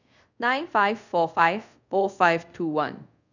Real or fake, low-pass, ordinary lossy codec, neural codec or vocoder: fake; 7.2 kHz; none; codec, 24 kHz, 0.5 kbps, DualCodec